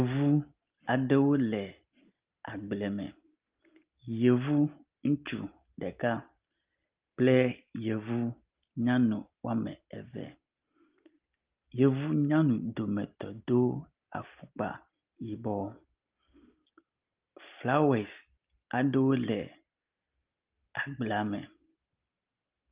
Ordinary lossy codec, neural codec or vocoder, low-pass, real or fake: Opus, 32 kbps; none; 3.6 kHz; real